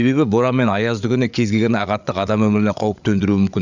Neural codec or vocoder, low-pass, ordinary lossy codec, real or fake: codec, 16 kHz, 8 kbps, FreqCodec, larger model; 7.2 kHz; none; fake